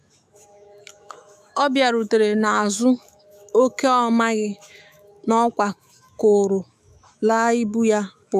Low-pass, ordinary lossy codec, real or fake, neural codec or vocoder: 14.4 kHz; none; fake; autoencoder, 48 kHz, 128 numbers a frame, DAC-VAE, trained on Japanese speech